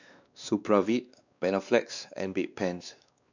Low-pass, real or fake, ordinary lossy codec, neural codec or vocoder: 7.2 kHz; fake; none; codec, 16 kHz, 2 kbps, X-Codec, WavLM features, trained on Multilingual LibriSpeech